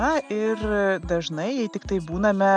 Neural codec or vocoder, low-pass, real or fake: none; 9.9 kHz; real